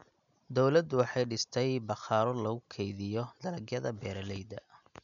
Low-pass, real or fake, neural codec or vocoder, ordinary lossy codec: 7.2 kHz; real; none; none